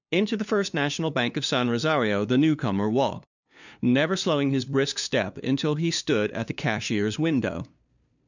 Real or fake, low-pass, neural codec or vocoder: fake; 7.2 kHz; codec, 16 kHz, 2 kbps, FunCodec, trained on LibriTTS, 25 frames a second